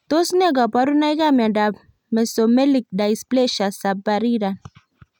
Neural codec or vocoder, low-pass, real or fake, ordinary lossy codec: none; 19.8 kHz; real; none